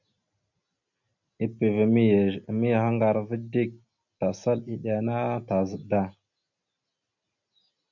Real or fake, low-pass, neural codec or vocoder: real; 7.2 kHz; none